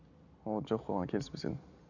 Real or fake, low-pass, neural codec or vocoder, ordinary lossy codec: real; 7.2 kHz; none; none